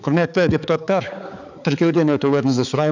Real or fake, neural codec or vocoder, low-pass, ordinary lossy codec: fake; codec, 16 kHz, 4 kbps, X-Codec, HuBERT features, trained on balanced general audio; 7.2 kHz; none